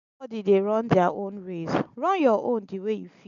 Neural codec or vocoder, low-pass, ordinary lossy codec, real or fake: none; 7.2 kHz; none; real